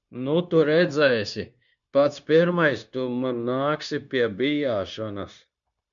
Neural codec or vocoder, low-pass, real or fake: codec, 16 kHz, 0.9 kbps, LongCat-Audio-Codec; 7.2 kHz; fake